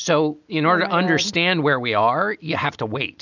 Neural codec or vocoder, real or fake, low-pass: none; real; 7.2 kHz